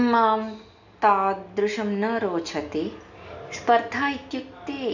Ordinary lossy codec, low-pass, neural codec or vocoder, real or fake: none; 7.2 kHz; none; real